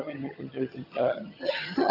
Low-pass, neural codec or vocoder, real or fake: 5.4 kHz; vocoder, 22.05 kHz, 80 mel bands, HiFi-GAN; fake